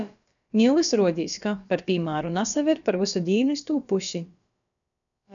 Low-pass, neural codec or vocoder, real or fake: 7.2 kHz; codec, 16 kHz, about 1 kbps, DyCAST, with the encoder's durations; fake